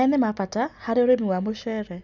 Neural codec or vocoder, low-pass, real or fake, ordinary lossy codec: none; 7.2 kHz; real; none